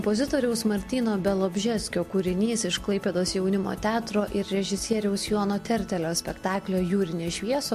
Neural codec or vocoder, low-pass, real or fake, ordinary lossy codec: none; 14.4 kHz; real; MP3, 64 kbps